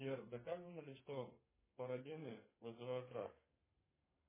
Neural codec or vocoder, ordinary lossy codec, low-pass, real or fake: codec, 32 kHz, 1.9 kbps, SNAC; MP3, 16 kbps; 3.6 kHz; fake